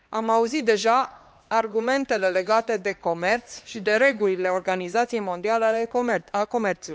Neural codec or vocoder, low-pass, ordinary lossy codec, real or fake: codec, 16 kHz, 2 kbps, X-Codec, HuBERT features, trained on LibriSpeech; none; none; fake